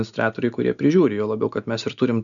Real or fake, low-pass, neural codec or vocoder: real; 7.2 kHz; none